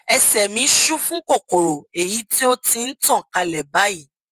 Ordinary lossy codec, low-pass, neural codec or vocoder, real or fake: Opus, 24 kbps; 14.4 kHz; vocoder, 48 kHz, 128 mel bands, Vocos; fake